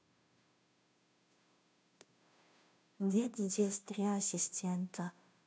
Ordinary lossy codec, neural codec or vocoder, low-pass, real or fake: none; codec, 16 kHz, 0.5 kbps, FunCodec, trained on Chinese and English, 25 frames a second; none; fake